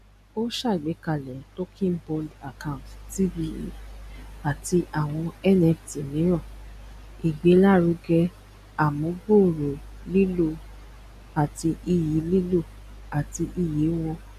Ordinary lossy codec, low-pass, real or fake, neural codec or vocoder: none; 14.4 kHz; real; none